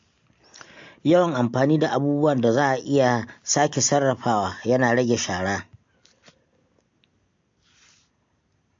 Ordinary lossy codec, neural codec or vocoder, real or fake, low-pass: MP3, 48 kbps; none; real; 7.2 kHz